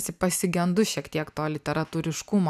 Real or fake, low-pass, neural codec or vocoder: real; 14.4 kHz; none